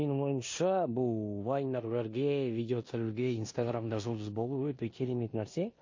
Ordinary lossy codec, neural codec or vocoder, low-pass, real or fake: MP3, 32 kbps; codec, 16 kHz in and 24 kHz out, 0.9 kbps, LongCat-Audio-Codec, four codebook decoder; 7.2 kHz; fake